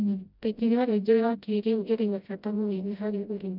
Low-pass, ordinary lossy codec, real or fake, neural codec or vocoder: 5.4 kHz; none; fake; codec, 16 kHz, 0.5 kbps, FreqCodec, smaller model